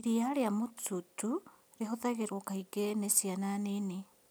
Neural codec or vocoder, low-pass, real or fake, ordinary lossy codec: none; none; real; none